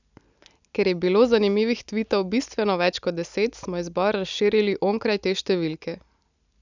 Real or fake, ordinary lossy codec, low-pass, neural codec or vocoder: real; none; 7.2 kHz; none